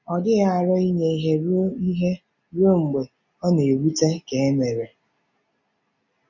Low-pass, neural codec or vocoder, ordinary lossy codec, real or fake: 7.2 kHz; none; none; real